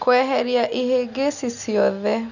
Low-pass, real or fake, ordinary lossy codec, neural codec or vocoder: 7.2 kHz; real; none; none